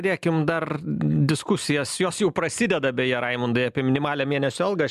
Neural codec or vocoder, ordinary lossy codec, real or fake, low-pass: none; MP3, 96 kbps; real; 14.4 kHz